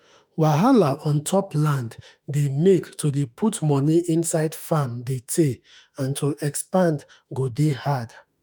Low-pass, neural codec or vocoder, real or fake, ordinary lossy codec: none; autoencoder, 48 kHz, 32 numbers a frame, DAC-VAE, trained on Japanese speech; fake; none